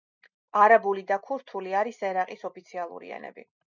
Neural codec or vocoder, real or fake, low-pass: none; real; 7.2 kHz